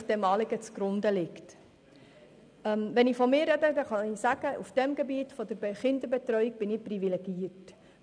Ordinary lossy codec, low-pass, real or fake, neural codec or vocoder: none; 9.9 kHz; real; none